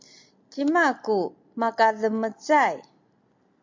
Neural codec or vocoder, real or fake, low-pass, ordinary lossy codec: none; real; 7.2 kHz; MP3, 48 kbps